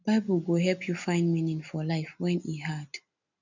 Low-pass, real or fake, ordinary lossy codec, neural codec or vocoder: 7.2 kHz; real; none; none